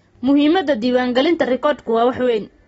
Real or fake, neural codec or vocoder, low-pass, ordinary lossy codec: real; none; 19.8 kHz; AAC, 24 kbps